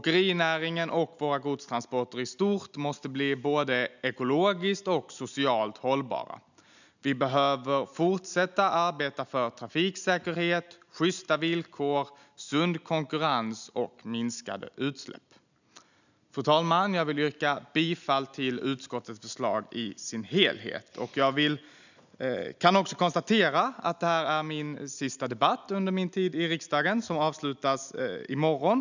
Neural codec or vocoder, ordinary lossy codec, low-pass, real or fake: none; none; 7.2 kHz; real